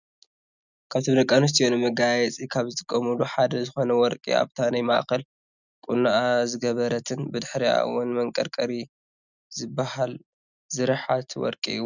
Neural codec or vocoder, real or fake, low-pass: none; real; 7.2 kHz